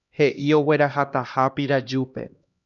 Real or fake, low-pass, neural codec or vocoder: fake; 7.2 kHz; codec, 16 kHz, 1 kbps, X-Codec, HuBERT features, trained on LibriSpeech